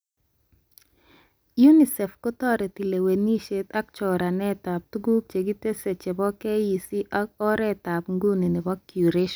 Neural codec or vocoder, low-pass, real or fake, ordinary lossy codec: none; none; real; none